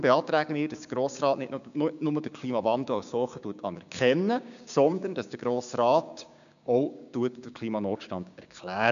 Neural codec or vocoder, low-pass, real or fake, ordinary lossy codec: codec, 16 kHz, 6 kbps, DAC; 7.2 kHz; fake; none